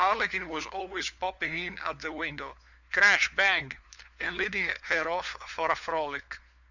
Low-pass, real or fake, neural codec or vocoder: 7.2 kHz; fake; codec, 16 kHz, 4 kbps, FunCodec, trained on LibriTTS, 50 frames a second